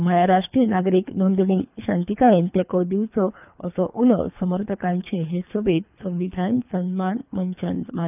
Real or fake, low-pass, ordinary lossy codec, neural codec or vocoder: fake; 3.6 kHz; none; codec, 24 kHz, 3 kbps, HILCodec